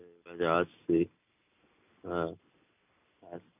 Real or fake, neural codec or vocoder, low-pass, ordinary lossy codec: real; none; 3.6 kHz; none